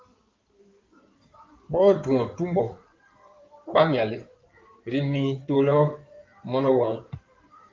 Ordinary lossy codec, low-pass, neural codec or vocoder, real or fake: Opus, 24 kbps; 7.2 kHz; codec, 16 kHz in and 24 kHz out, 2.2 kbps, FireRedTTS-2 codec; fake